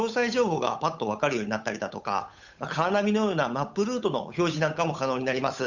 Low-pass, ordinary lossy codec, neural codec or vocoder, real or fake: 7.2 kHz; none; codec, 16 kHz, 8 kbps, FunCodec, trained on Chinese and English, 25 frames a second; fake